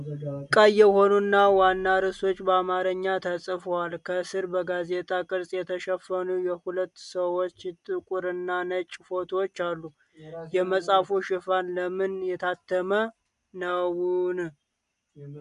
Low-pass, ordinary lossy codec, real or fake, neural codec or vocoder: 10.8 kHz; MP3, 96 kbps; real; none